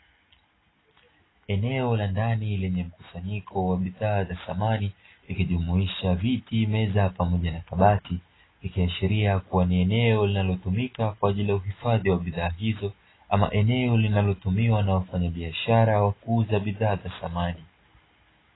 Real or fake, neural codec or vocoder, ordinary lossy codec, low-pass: real; none; AAC, 16 kbps; 7.2 kHz